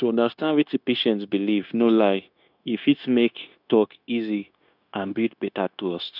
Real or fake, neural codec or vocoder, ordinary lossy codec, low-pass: fake; codec, 16 kHz, 0.9 kbps, LongCat-Audio-Codec; none; 5.4 kHz